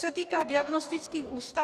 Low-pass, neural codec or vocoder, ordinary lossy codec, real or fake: 14.4 kHz; codec, 44.1 kHz, 2.6 kbps, DAC; Opus, 64 kbps; fake